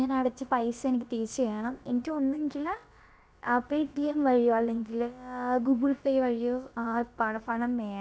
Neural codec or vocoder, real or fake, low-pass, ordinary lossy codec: codec, 16 kHz, about 1 kbps, DyCAST, with the encoder's durations; fake; none; none